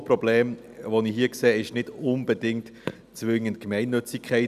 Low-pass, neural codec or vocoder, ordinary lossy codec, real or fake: 14.4 kHz; none; none; real